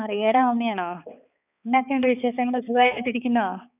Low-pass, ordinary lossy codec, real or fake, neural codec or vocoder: 3.6 kHz; none; fake; codec, 16 kHz, 4 kbps, X-Codec, HuBERT features, trained on balanced general audio